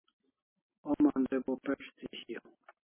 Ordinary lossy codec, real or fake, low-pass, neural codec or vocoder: MP3, 16 kbps; real; 3.6 kHz; none